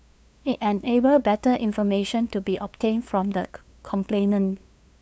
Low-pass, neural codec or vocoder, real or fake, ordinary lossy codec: none; codec, 16 kHz, 2 kbps, FunCodec, trained on LibriTTS, 25 frames a second; fake; none